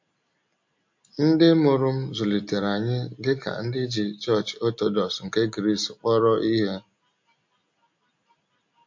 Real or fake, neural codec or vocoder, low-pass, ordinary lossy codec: real; none; 7.2 kHz; MP3, 48 kbps